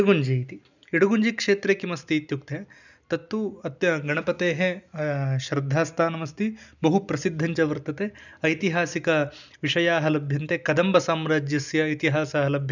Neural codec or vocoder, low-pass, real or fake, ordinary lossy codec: none; 7.2 kHz; real; none